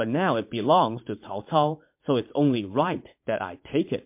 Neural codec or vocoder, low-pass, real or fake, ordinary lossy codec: vocoder, 44.1 kHz, 80 mel bands, Vocos; 3.6 kHz; fake; MP3, 32 kbps